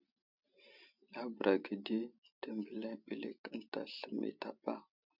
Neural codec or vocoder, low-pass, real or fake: none; 5.4 kHz; real